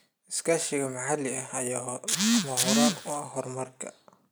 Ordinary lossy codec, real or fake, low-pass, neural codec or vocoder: none; real; none; none